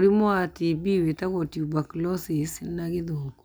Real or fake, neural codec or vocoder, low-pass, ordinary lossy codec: real; none; none; none